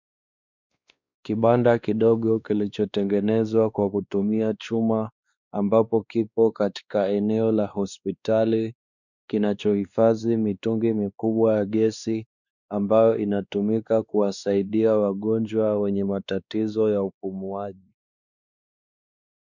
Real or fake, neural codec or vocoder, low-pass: fake; codec, 16 kHz, 2 kbps, X-Codec, WavLM features, trained on Multilingual LibriSpeech; 7.2 kHz